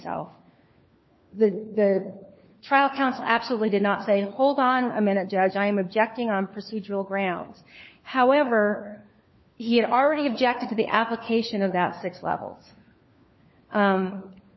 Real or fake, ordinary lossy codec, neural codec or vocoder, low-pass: fake; MP3, 24 kbps; codec, 16 kHz, 4 kbps, FunCodec, trained on LibriTTS, 50 frames a second; 7.2 kHz